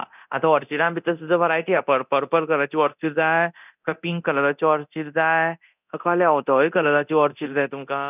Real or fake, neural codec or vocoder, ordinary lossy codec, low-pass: fake; codec, 24 kHz, 0.9 kbps, DualCodec; none; 3.6 kHz